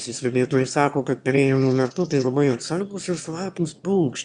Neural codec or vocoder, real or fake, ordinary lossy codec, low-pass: autoencoder, 22.05 kHz, a latent of 192 numbers a frame, VITS, trained on one speaker; fake; Opus, 64 kbps; 9.9 kHz